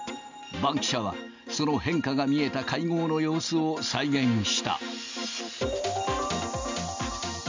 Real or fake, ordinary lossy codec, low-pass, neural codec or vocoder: real; MP3, 64 kbps; 7.2 kHz; none